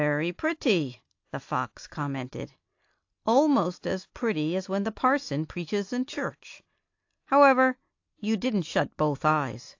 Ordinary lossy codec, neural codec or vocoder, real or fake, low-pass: AAC, 48 kbps; none; real; 7.2 kHz